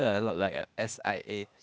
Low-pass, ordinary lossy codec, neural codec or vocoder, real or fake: none; none; codec, 16 kHz, 0.8 kbps, ZipCodec; fake